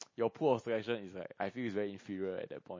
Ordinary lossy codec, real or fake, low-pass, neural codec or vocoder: MP3, 32 kbps; real; 7.2 kHz; none